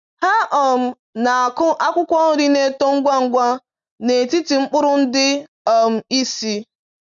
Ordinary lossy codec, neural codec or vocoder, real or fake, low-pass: none; none; real; 7.2 kHz